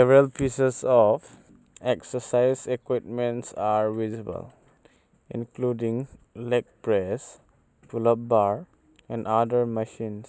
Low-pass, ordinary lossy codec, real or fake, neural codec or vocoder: none; none; real; none